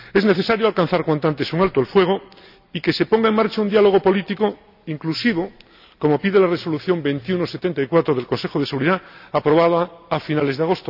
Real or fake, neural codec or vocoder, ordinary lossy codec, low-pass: real; none; none; 5.4 kHz